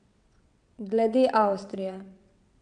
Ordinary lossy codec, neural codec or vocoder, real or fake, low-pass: none; vocoder, 22.05 kHz, 80 mel bands, WaveNeXt; fake; 9.9 kHz